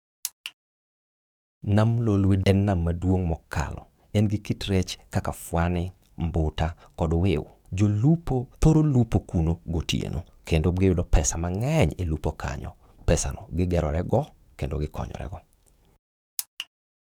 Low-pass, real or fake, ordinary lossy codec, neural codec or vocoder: 19.8 kHz; fake; none; codec, 44.1 kHz, 7.8 kbps, DAC